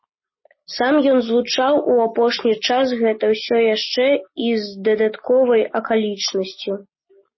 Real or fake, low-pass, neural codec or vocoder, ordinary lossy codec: real; 7.2 kHz; none; MP3, 24 kbps